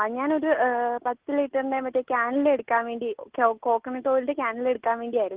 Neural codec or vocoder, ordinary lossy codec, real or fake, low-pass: none; Opus, 16 kbps; real; 3.6 kHz